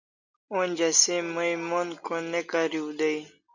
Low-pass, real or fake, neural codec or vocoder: 7.2 kHz; real; none